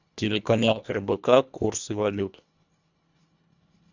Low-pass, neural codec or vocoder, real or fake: 7.2 kHz; codec, 24 kHz, 1.5 kbps, HILCodec; fake